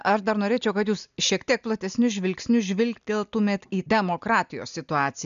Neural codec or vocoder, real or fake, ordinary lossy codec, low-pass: none; real; AAC, 96 kbps; 7.2 kHz